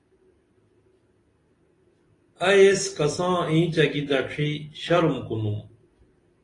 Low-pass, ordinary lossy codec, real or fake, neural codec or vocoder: 10.8 kHz; AAC, 32 kbps; real; none